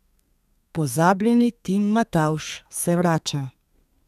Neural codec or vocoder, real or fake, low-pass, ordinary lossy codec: codec, 32 kHz, 1.9 kbps, SNAC; fake; 14.4 kHz; none